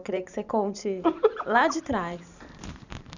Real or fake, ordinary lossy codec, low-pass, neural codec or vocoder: fake; none; 7.2 kHz; vocoder, 44.1 kHz, 128 mel bands every 512 samples, BigVGAN v2